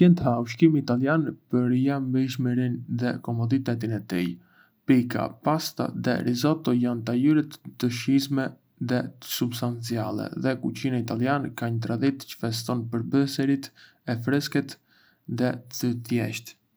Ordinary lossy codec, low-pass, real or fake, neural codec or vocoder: none; none; real; none